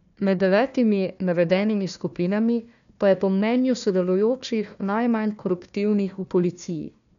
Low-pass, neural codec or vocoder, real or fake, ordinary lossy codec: 7.2 kHz; codec, 16 kHz, 1 kbps, FunCodec, trained on Chinese and English, 50 frames a second; fake; none